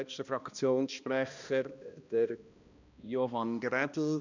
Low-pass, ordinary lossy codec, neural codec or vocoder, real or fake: 7.2 kHz; none; codec, 16 kHz, 1 kbps, X-Codec, HuBERT features, trained on balanced general audio; fake